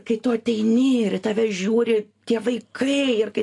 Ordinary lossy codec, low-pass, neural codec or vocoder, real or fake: AAC, 48 kbps; 10.8 kHz; none; real